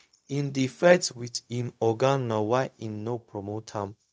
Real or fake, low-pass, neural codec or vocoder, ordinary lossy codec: fake; none; codec, 16 kHz, 0.4 kbps, LongCat-Audio-Codec; none